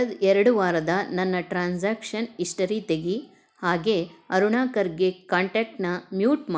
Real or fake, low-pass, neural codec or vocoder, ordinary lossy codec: real; none; none; none